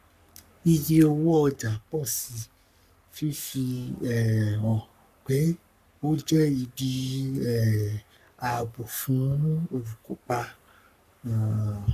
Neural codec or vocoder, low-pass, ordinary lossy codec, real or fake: codec, 44.1 kHz, 3.4 kbps, Pupu-Codec; 14.4 kHz; none; fake